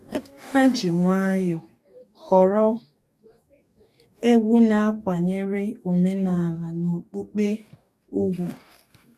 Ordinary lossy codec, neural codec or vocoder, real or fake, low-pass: none; codec, 44.1 kHz, 2.6 kbps, DAC; fake; 14.4 kHz